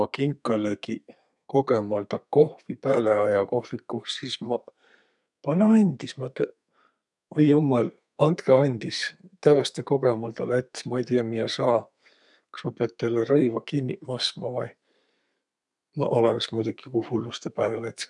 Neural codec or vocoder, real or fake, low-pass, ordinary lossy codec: codec, 32 kHz, 1.9 kbps, SNAC; fake; 10.8 kHz; none